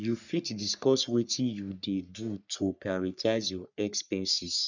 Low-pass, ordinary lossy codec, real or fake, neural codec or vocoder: 7.2 kHz; none; fake; codec, 44.1 kHz, 3.4 kbps, Pupu-Codec